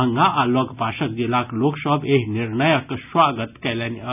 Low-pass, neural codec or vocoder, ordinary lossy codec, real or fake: 3.6 kHz; none; none; real